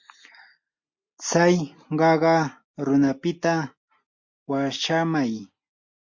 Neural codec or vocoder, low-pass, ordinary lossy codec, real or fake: none; 7.2 kHz; MP3, 48 kbps; real